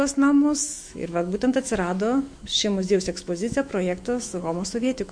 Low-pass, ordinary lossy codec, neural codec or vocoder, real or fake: 9.9 kHz; MP3, 48 kbps; autoencoder, 48 kHz, 128 numbers a frame, DAC-VAE, trained on Japanese speech; fake